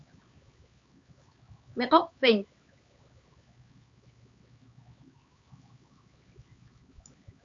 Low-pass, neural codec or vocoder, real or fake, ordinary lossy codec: 7.2 kHz; codec, 16 kHz, 4 kbps, X-Codec, HuBERT features, trained on LibriSpeech; fake; Opus, 64 kbps